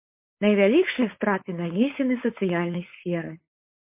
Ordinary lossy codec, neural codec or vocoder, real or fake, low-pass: MP3, 24 kbps; codec, 16 kHz, 4.8 kbps, FACodec; fake; 3.6 kHz